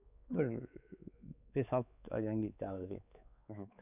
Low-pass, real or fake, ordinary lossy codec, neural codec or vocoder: 3.6 kHz; fake; none; codec, 16 kHz, 4 kbps, X-Codec, WavLM features, trained on Multilingual LibriSpeech